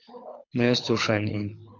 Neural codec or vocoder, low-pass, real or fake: codec, 24 kHz, 6 kbps, HILCodec; 7.2 kHz; fake